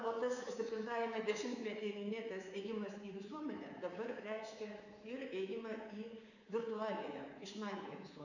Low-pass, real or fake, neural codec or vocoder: 7.2 kHz; fake; codec, 24 kHz, 3.1 kbps, DualCodec